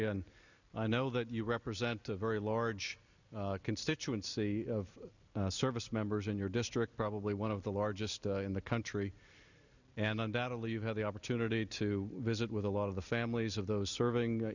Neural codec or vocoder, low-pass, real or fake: none; 7.2 kHz; real